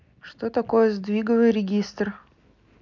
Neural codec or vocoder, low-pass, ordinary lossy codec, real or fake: none; 7.2 kHz; none; real